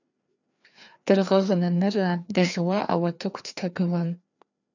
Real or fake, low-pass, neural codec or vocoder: fake; 7.2 kHz; codec, 16 kHz, 2 kbps, FreqCodec, larger model